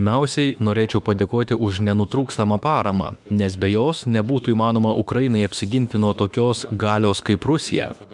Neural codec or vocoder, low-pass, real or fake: autoencoder, 48 kHz, 32 numbers a frame, DAC-VAE, trained on Japanese speech; 10.8 kHz; fake